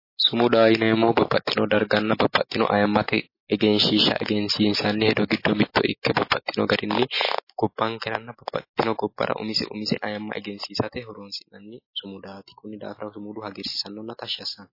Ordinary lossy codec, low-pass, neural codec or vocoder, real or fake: MP3, 24 kbps; 5.4 kHz; none; real